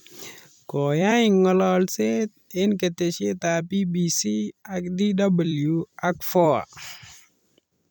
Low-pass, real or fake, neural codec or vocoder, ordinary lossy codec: none; fake; vocoder, 44.1 kHz, 128 mel bands every 256 samples, BigVGAN v2; none